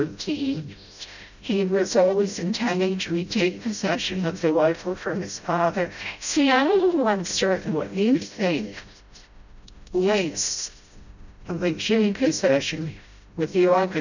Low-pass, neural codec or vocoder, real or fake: 7.2 kHz; codec, 16 kHz, 0.5 kbps, FreqCodec, smaller model; fake